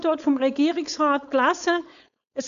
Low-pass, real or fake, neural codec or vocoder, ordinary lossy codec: 7.2 kHz; fake; codec, 16 kHz, 4.8 kbps, FACodec; AAC, 96 kbps